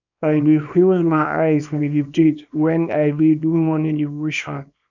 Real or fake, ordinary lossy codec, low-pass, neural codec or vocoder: fake; none; 7.2 kHz; codec, 24 kHz, 0.9 kbps, WavTokenizer, small release